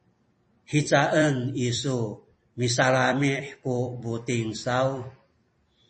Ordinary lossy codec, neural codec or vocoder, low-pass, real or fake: MP3, 32 kbps; vocoder, 44.1 kHz, 128 mel bands every 256 samples, BigVGAN v2; 10.8 kHz; fake